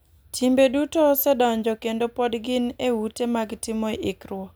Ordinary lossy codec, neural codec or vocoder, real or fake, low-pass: none; none; real; none